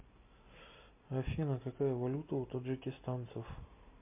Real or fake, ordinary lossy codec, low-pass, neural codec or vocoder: real; MP3, 32 kbps; 3.6 kHz; none